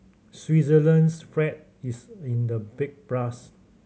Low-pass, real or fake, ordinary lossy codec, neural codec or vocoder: none; real; none; none